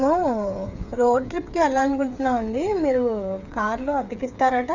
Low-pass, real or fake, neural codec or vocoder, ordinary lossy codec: 7.2 kHz; fake; codec, 16 kHz, 8 kbps, FreqCodec, smaller model; Opus, 64 kbps